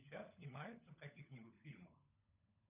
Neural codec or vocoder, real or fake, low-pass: codec, 16 kHz, 16 kbps, FunCodec, trained on LibriTTS, 50 frames a second; fake; 3.6 kHz